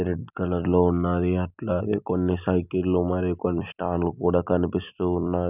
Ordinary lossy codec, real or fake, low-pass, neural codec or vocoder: none; real; 3.6 kHz; none